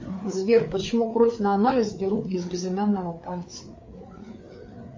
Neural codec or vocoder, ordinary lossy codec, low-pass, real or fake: codec, 16 kHz, 4 kbps, FreqCodec, larger model; MP3, 32 kbps; 7.2 kHz; fake